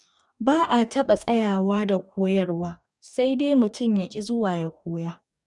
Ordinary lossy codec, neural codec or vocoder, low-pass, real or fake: none; codec, 44.1 kHz, 2.6 kbps, DAC; 10.8 kHz; fake